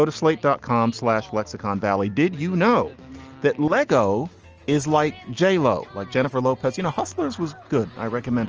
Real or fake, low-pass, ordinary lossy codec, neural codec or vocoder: real; 7.2 kHz; Opus, 24 kbps; none